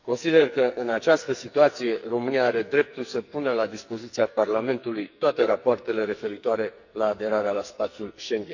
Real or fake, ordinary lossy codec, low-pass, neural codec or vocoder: fake; none; 7.2 kHz; codec, 44.1 kHz, 2.6 kbps, SNAC